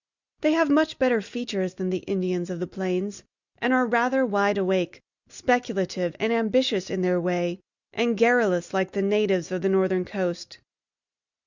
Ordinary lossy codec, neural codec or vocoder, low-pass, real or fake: Opus, 64 kbps; none; 7.2 kHz; real